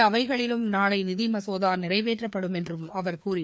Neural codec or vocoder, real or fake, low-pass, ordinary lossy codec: codec, 16 kHz, 2 kbps, FreqCodec, larger model; fake; none; none